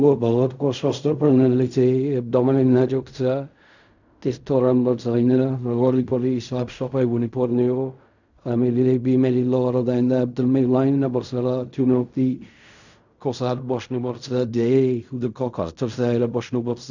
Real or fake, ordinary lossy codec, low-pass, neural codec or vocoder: fake; none; 7.2 kHz; codec, 16 kHz in and 24 kHz out, 0.4 kbps, LongCat-Audio-Codec, fine tuned four codebook decoder